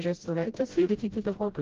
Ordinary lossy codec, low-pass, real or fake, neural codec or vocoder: Opus, 16 kbps; 7.2 kHz; fake; codec, 16 kHz, 0.5 kbps, FreqCodec, smaller model